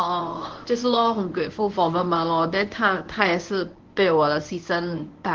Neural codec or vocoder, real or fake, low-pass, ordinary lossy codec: codec, 24 kHz, 0.9 kbps, WavTokenizer, medium speech release version 1; fake; 7.2 kHz; Opus, 16 kbps